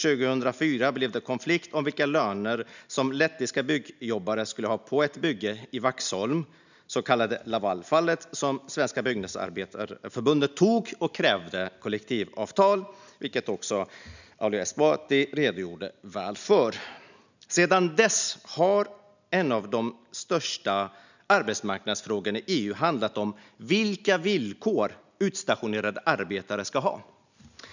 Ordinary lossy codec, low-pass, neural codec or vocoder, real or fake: none; 7.2 kHz; none; real